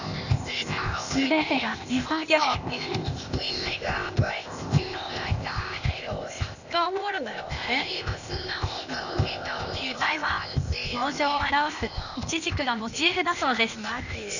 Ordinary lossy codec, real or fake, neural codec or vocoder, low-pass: none; fake; codec, 16 kHz, 0.8 kbps, ZipCodec; 7.2 kHz